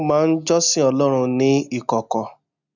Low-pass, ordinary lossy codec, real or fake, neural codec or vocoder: 7.2 kHz; none; real; none